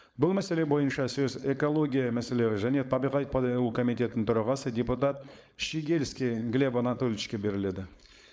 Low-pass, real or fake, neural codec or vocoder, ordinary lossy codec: none; fake; codec, 16 kHz, 4.8 kbps, FACodec; none